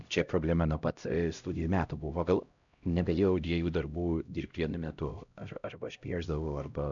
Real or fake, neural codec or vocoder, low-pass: fake; codec, 16 kHz, 0.5 kbps, X-Codec, HuBERT features, trained on LibriSpeech; 7.2 kHz